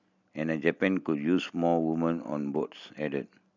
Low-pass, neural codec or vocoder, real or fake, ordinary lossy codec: 7.2 kHz; none; real; none